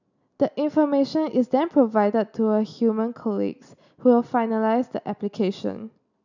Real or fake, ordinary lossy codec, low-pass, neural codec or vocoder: real; none; 7.2 kHz; none